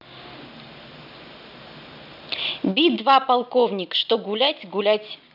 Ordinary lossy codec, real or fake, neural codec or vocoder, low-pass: none; real; none; 5.4 kHz